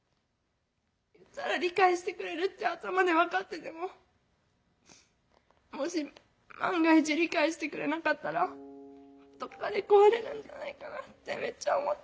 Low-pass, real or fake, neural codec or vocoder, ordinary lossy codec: none; real; none; none